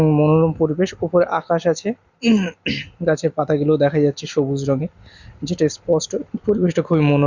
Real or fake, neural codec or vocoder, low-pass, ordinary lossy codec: real; none; 7.2 kHz; Opus, 64 kbps